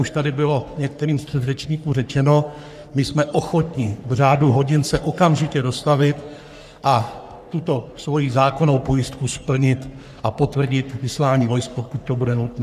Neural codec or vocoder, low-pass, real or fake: codec, 44.1 kHz, 3.4 kbps, Pupu-Codec; 14.4 kHz; fake